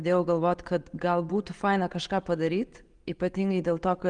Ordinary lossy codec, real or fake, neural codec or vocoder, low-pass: Opus, 24 kbps; real; none; 9.9 kHz